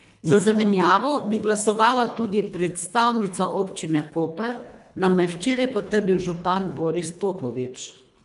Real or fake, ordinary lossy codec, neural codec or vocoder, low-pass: fake; none; codec, 24 kHz, 1.5 kbps, HILCodec; 10.8 kHz